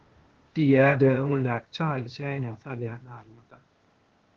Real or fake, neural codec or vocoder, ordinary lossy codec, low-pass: fake; codec, 16 kHz, 0.8 kbps, ZipCodec; Opus, 16 kbps; 7.2 kHz